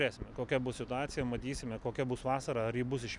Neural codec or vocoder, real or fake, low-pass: none; real; 10.8 kHz